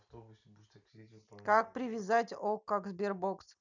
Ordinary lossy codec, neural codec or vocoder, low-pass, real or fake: none; none; 7.2 kHz; real